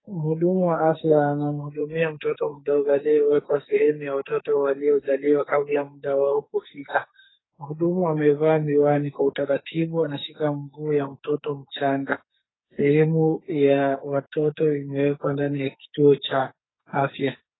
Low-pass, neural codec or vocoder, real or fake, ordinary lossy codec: 7.2 kHz; codec, 44.1 kHz, 2.6 kbps, SNAC; fake; AAC, 16 kbps